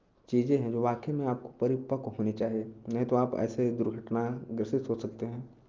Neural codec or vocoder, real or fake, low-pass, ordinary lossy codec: none; real; 7.2 kHz; Opus, 32 kbps